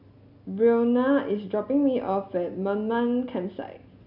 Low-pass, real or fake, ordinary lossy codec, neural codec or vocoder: 5.4 kHz; real; none; none